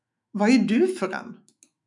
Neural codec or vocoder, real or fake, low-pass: autoencoder, 48 kHz, 128 numbers a frame, DAC-VAE, trained on Japanese speech; fake; 10.8 kHz